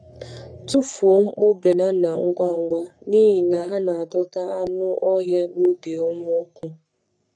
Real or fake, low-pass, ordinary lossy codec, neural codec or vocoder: fake; 9.9 kHz; none; codec, 44.1 kHz, 3.4 kbps, Pupu-Codec